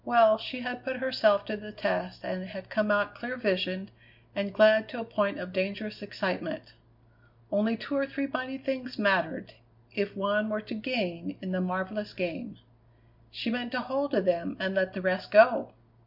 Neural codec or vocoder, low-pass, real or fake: none; 5.4 kHz; real